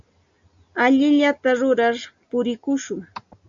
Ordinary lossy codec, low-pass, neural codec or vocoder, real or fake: AAC, 64 kbps; 7.2 kHz; none; real